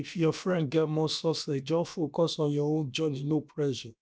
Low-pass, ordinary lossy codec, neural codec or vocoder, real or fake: none; none; codec, 16 kHz, about 1 kbps, DyCAST, with the encoder's durations; fake